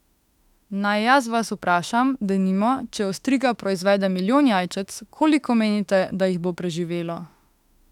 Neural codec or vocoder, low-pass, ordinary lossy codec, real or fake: autoencoder, 48 kHz, 32 numbers a frame, DAC-VAE, trained on Japanese speech; 19.8 kHz; none; fake